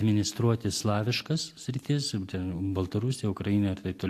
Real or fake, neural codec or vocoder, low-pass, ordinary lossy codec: real; none; 14.4 kHz; AAC, 64 kbps